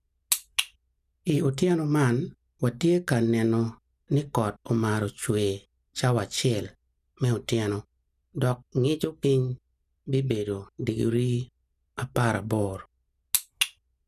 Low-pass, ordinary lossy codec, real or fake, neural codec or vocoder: 14.4 kHz; none; real; none